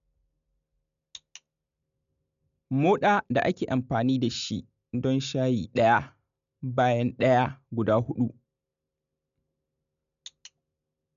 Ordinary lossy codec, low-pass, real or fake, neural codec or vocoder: MP3, 96 kbps; 7.2 kHz; real; none